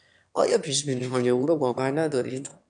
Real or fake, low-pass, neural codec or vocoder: fake; 9.9 kHz; autoencoder, 22.05 kHz, a latent of 192 numbers a frame, VITS, trained on one speaker